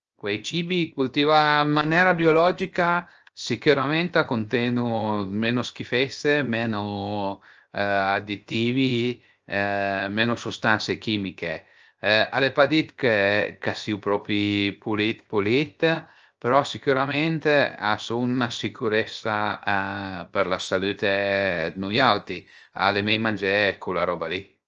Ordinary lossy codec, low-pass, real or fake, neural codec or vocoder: Opus, 24 kbps; 7.2 kHz; fake; codec, 16 kHz, 0.7 kbps, FocalCodec